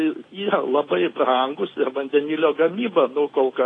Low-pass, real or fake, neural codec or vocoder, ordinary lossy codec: 9.9 kHz; real; none; AAC, 32 kbps